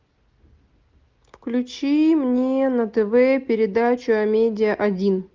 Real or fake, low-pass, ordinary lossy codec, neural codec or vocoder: real; 7.2 kHz; Opus, 32 kbps; none